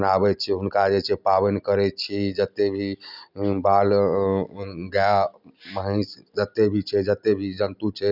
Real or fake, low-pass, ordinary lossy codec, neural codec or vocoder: real; 5.4 kHz; none; none